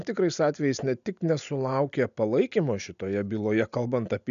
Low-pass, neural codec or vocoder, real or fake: 7.2 kHz; none; real